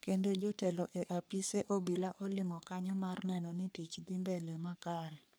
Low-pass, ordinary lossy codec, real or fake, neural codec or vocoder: none; none; fake; codec, 44.1 kHz, 3.4 kbps, Pupu-Codec